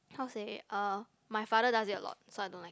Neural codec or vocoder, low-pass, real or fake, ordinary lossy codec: none; none; real; none